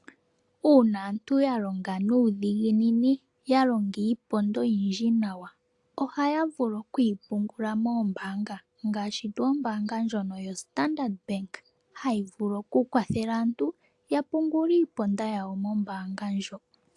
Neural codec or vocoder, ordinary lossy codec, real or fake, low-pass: none; AAC, 64 kbps; real; 10.8 kHz